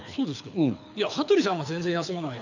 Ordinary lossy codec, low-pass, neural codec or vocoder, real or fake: none; 7.2 kHz; codec, 24 kHz, 6 kbps, HILCodec; fake